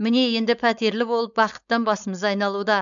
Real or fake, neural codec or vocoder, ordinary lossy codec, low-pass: fake; codec, 16 kHz, 4.8 kbps, FACodec; none; 7.2 kHz